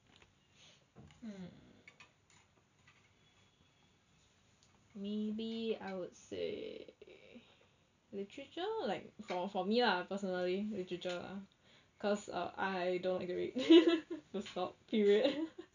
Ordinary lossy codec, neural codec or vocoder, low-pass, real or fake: none; none; 7.2 kHz; real